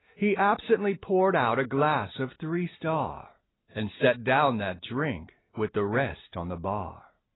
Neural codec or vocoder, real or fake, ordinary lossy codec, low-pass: none; real; AAC, 16 kbps; 7.2 kHz